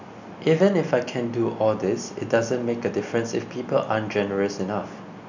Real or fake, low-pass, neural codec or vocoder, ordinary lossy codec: real; 7.2 kHz; none; none